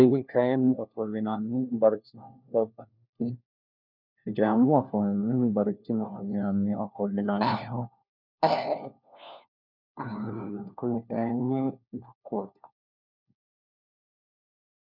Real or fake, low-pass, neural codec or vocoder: fake; 5.4 kHz; codec, 16 kHz, 1 kbps, FunCodec, trained on LibriTTS, 50 frames a second